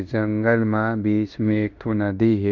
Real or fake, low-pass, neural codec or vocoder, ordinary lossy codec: fake; 7.2 kHz; codec, 16 kHz in and 24 kHz out, 0.9 kbps, LongCat-Audio-Codec, fine tuned four codebook decoder; none